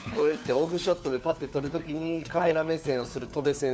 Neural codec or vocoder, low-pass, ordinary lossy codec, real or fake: codec, 16 kHz, 4 kbps, FunCodec, trained on LibriTTS, 50 frames a second; none; none; fake